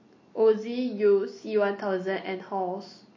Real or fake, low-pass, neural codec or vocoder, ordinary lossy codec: real; 7.2 kHz; none; MP3, 32 kbps